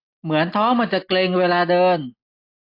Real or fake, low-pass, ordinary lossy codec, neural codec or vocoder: real; 5.4 kHz; AAC, 32 kbps; none